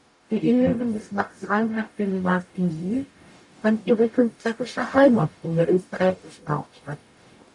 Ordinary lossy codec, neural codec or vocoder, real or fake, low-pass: MP3, 96 kbps; codec, 44.1 kHz, 0.9 kbps, DAC; fake; 10.8 kHz